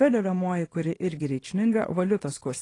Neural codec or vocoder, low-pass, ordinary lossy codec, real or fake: codec, 24 kHz, 0.9 kbps, WavTokenizer, small release; 10.8 kHz; AAC, 32 kbps; fake